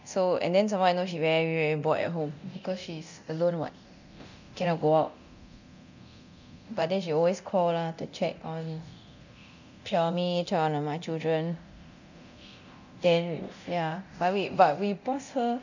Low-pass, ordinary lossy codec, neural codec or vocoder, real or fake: 7.2 kHz; none; codec, 24 kHz, 0.9 kbps, DualCodec; fake